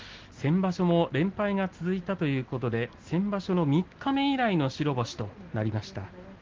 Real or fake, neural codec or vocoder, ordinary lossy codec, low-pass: real; none; Opus, 16 kbps; 7.2 kHz